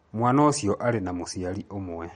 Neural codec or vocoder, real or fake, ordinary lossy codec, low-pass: none; real; MP3, 48 kbps; 9.9 kHz